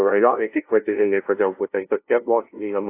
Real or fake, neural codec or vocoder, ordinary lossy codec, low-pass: fake; codec, 16 kHz, 0.5 kbps, FunCodec, trained on LibriTTS, 25 frames a second; AAC, 24 kbps; 3.6 kHz